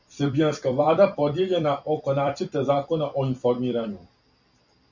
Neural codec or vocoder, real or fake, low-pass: vocoder, 44.1 kHz, 128 mel bands every 256 samples, BigVGAN v2; fake; 7.2 kHz